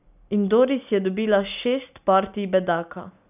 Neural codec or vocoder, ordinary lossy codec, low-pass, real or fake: none; none; 3.6 kHz; real